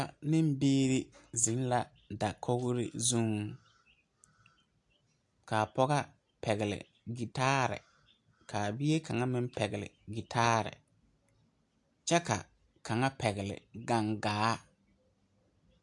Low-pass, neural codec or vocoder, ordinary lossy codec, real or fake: 10.8 kHz; none; AAC, 64 kbps; real